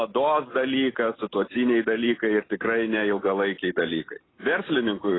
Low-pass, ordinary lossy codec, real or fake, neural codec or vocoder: 7.2 kHz; AAC, 16 kbps; real; none